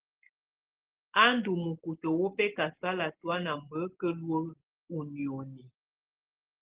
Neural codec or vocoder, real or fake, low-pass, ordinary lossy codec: none; real; 3.6 kHz; Opus, 16 kbps